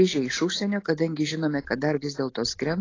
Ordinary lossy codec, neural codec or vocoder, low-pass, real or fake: AAC, 32 kbps; none; 7.2 kHz; real